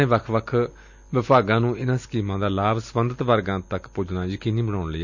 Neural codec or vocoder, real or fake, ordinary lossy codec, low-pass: none; real; none; 7.2 kHz